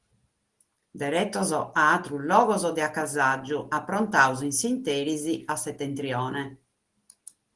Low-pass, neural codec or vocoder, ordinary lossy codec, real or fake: 10.8 kHz; none; Opus, 24 kbps; real